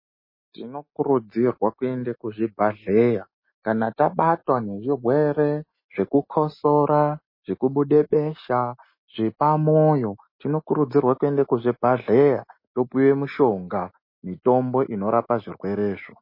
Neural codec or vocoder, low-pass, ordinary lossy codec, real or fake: none; 5.4 kHz; MP3, 24 kbps; real